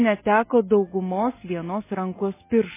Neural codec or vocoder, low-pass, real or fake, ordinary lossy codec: none; 3.6 kHz; real; MP3, 16 kbps